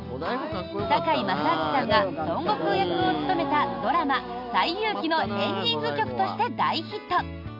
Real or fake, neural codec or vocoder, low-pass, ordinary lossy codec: real; none; 5.4 kHz; none